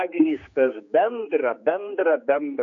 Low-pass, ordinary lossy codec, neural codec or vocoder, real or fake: 7.2 kHz; AAC, 48 kbps; codec, 16 kHz, 4 kbps, X-Codec, HuBERT features, trained on general audio; fake